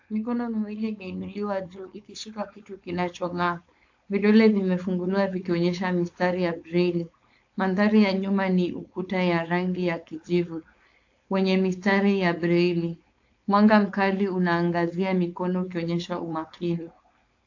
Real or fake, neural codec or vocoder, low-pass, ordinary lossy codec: fake; codec, 16 kHz, 4.8 kbps, FACodec; 7.2 kHz; AAC, 48 kbps